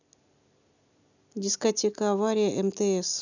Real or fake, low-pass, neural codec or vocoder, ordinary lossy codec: real; 7.2 kHz; none; none